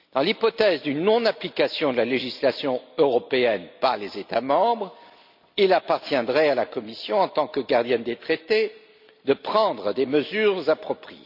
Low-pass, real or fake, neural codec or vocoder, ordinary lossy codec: 5.4 kHz; real; none; none